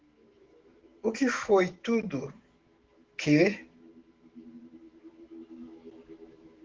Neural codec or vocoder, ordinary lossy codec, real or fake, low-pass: autoencoder, 48 kHz, 128 numbers a frame, DAC-VAE, trained on Japanese speech; Opus, 16 kbps; fake; 7.2 kHz